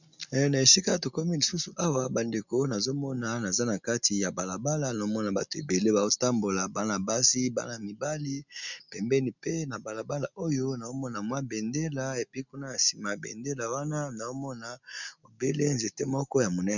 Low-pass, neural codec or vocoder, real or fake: 7.2 kHz; none; real